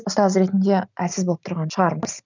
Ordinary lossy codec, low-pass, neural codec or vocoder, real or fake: none; 7.2 kHz; none; real